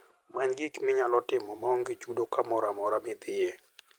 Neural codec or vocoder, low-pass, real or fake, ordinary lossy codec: vocoder, 44.1 kHz, 128 mel bands every 512 samples, BigVGAN v2; 19.8 kHz; fake; Opus, 32 kbps